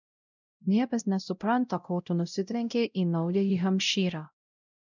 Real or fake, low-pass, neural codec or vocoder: fake; 7.2 kHz; codec, 16 kHz, 0.5 kbps, X-Codec, WavLM features, trained on Multilingual LibriSpeech